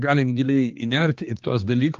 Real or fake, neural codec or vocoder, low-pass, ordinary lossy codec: fake; codec, 16 kHz, 2 kbps, X-Codec, HuBERT features, trained on general audio; 7.2 kHz; Opus, 24 kbps